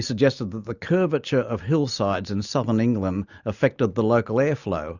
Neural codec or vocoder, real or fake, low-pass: none; real; 7.2 kHz